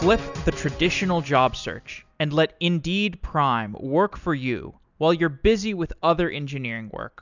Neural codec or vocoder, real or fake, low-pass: none; real; 7.2 kHz